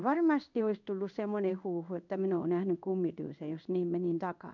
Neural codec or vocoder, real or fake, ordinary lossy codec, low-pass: codec, 16 kHz in and 24 kHz out, 1 kbps, XY-Tokenizer; fake; none; 7.2 kHz